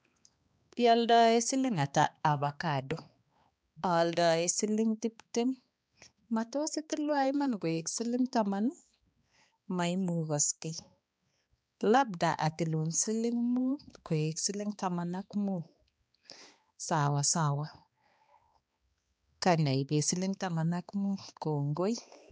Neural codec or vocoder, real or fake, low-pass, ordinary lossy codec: codec, 16 kHz, 2 kbps, X-Codec, HuBERT features, trained on balanced general audio; fake; none; none